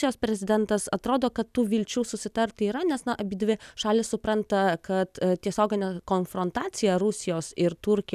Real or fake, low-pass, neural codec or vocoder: real; 14.4 kHz; none